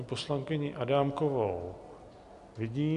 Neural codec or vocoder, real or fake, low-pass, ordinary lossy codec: none; real; 10.8 kHz; AAC, 96 kbps